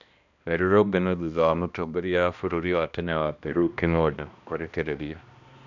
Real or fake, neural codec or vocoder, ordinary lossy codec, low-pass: fake; codec, 16 kHz, 1 kbps, X-Codec, HuBERT features, trained on balanced general audio; none; 7.2 kHz